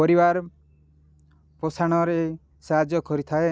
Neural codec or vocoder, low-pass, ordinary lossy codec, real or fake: none; none; none; real